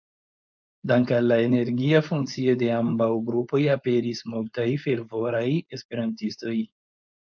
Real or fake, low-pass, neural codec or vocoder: fake; 7.2 kHz; codec, 16 kHz, 4.8 kbps, FACodec